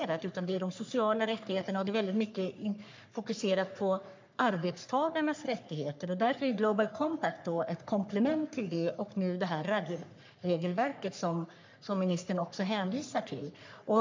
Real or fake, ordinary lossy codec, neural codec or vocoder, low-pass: fake; MP3, 64 kbps; codec, 44.1 kHz, 3.4 kbps, Pupu-Codec; 7.2 kHz